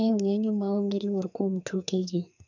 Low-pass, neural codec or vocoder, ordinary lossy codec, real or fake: 7.2 kHz; codec, 44.1 kHz, 2.6 kbps, SNAC; none; fake